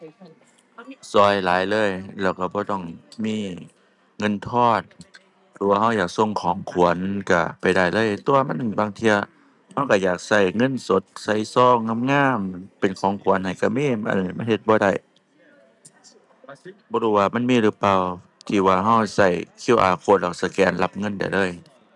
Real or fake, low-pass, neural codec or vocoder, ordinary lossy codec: real; 10.8 kHz; none; none